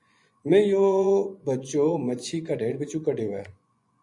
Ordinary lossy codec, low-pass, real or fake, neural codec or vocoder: MP3, 96 kbps; 10.8 kHz; fake; vocoder, 24 kHz, 100 mel bands, Vocos